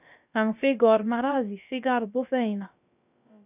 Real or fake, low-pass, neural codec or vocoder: fake; 3.6 kHz; codec, 16 kHz, about 1 kbps, DyCAST, with the encoder's durations